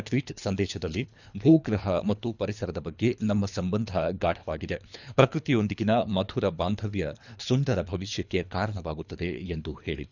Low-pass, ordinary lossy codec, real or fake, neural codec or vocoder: 7.2 kHz; none; fake; codec, 24 kHz, 3 kbps, HILCodec